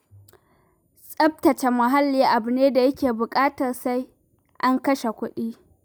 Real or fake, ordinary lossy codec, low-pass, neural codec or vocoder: real; none; none; none